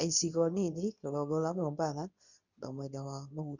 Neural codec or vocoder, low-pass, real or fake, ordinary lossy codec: codec, 24 kHz, 0.9 kbps, WavTokenizer, medium speech release version 2; 7.2 kHz; fake; none